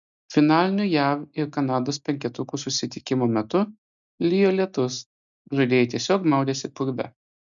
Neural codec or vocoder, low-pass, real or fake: none; 7.2 kHz; real